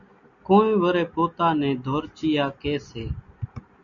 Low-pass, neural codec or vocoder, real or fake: 7.2 kHz; none; real